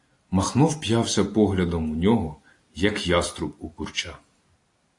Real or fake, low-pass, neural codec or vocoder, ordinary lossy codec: real; 10.8 kHz; none; AAC, 48 kbps